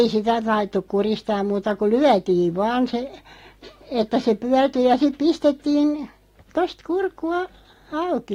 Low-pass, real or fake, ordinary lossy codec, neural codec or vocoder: 19.8 kHz; real; AAC, 48 kbps; none